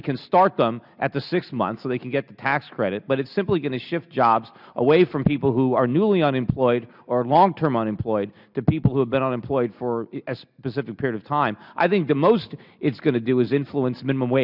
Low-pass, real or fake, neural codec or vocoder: 5.4 kHz; real; none